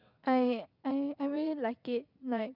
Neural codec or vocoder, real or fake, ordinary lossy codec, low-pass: vocoder, 22.05 kHz, 80 mel bands, WaveNeXt; fake; none; 5.4 kHz